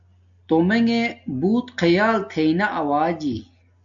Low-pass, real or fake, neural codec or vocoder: 7.2 kHz; real; none